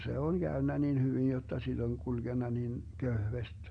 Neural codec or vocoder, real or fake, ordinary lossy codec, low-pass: none; real; Opus, 64 kbps; 9.9 kHz